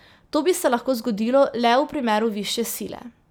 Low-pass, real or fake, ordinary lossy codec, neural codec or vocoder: none; real; none; none